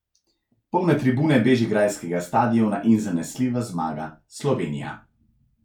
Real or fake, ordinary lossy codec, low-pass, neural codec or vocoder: real; none; 19.8 kHz; none